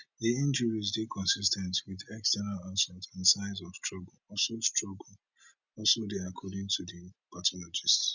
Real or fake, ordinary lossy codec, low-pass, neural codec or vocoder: real; none; 7.2 kHz; none